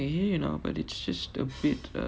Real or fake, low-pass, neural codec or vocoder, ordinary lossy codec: real; none; none; none